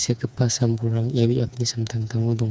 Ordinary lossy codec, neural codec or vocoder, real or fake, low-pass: none; codec, 16 kHz, 4 kbps, FreqCodec, smaller model; fake; none